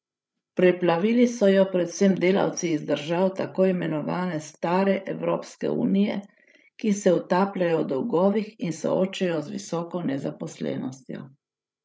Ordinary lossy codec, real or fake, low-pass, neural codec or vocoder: none; fake; none; codec, 16 kHz, 16 kbps, FreqCodec, larger model